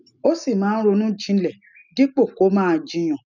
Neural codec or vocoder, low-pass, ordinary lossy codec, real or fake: none; 7.2 kHz; none; real